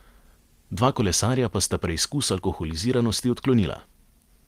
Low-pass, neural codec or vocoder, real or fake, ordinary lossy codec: 14.4 kHz; none; real; Opus, 24 kbps